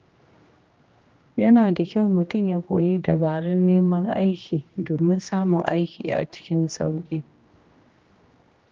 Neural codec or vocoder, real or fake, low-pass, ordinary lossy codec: codec, 16 kHz, 1 kbps, X-Codec, HuBERT features, trained on general audio; fake; 7.2 kHz; Opus, 24 kbps